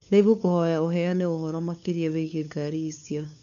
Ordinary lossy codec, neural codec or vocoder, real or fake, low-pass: none; codec, 16 kHz, 2 kbps, FunCodec, trained on LibriTTS, 25 frames a second; fake; 7.2 kHz